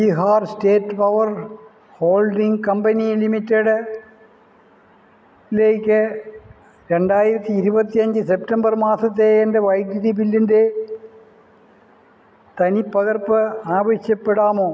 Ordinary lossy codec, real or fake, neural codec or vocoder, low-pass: none; real; none; none